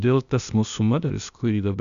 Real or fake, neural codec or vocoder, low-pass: fake; codec, 16 kHz, 0.8 kbps, ZipCodec; 7.2 kHz